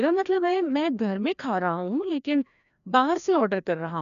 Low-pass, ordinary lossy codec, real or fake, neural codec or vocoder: 7.2 kHz; none; fake; codec, 16 kHz, 1 kbps, FreqCodec, larger model